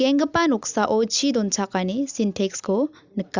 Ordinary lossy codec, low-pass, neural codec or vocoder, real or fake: Opus, 64 kbps; 7.2 kHz; none; real